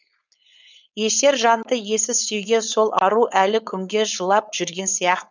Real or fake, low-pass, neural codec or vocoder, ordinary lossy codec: fake; 7.2 kHz; codec, 16 kHz, 4.8 kbps, FACodec; none